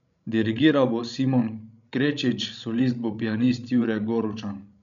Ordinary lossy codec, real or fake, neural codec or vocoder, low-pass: none; fake; codec, 16 kHz, 16 kbps, FreqCodec, larger model; 7.2 kHz